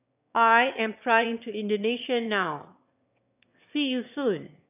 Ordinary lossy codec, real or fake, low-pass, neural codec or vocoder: none; fake; 3.6 kHz; autoencoder, 22.05 kHz, a latent of 192 numbers a frame, VITS, trained on one speaker